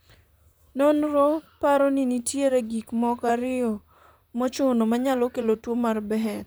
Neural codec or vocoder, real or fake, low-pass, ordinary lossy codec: vocoder, 44.1 kHz, 128 mel bands, Pupu-Vocoder; fake; none; none